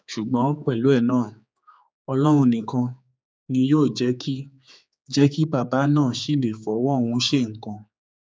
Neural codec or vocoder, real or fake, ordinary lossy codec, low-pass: codec, 16 kHz, 4 kbps, X-Codec, HuBERT features, trained on general audio; fake; none; none